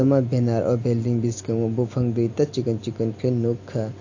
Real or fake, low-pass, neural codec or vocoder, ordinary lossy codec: real; 7.2 kHz; none; AAC, 48 kbps